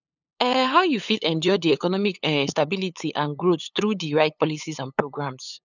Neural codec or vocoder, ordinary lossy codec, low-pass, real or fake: codec, 16 kHz, 8 kbps, FunCodec, trained on LibriTTS, 25 frames a second; none; 7.2 kHz; fake